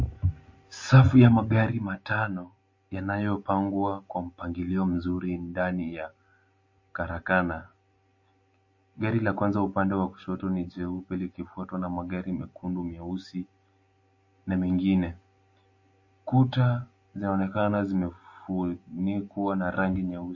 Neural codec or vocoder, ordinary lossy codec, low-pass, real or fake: none; MP3, 32 kbps; 7.2 kHz; real